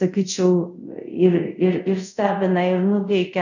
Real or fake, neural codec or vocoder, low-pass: fake; codec, 24 kHz, 0.5 kbps, DualCodec; 7.2 kHz